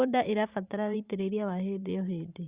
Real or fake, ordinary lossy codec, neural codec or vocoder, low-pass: fake; Opus, 64 kbps; vocoder, 44.1 kHz, 128 mel bands every 512 samples, BigVGAN v2; 3.6 kHz